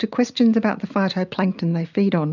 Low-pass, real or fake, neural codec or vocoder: 7.2 kHz; real; none